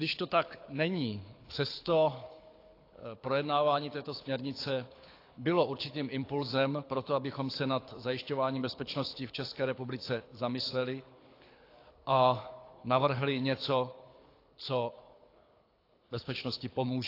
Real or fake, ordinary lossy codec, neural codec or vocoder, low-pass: fake; AAC, 32 kbps; codec, 24 kHz, 6 kbps, HILCodec; 5.4 kHz